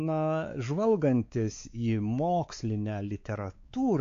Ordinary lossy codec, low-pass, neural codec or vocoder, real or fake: AAC, 48 kbps; 7.2 kHz; codec, 16 kHz, 4 kbps, X-Codec, WavLM features, trained on Multilingual LibriSpeech; fake